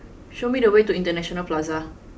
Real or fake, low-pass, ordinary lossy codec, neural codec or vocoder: real; none; none; none